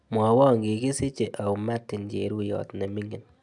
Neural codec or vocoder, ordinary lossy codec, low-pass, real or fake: none; none; 10.8 kHz; real